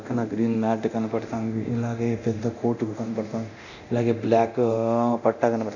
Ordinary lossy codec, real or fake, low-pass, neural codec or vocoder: none; fake; 7.2 kHz; codec, 24 kHz, 0.9 kbps, DualCodec